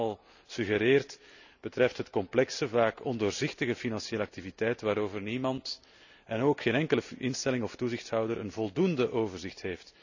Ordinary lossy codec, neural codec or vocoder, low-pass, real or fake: none; none; 7.2 kHz; real